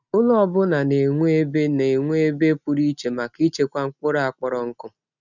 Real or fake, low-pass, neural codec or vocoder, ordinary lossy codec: real; 7.2 kHz; none; none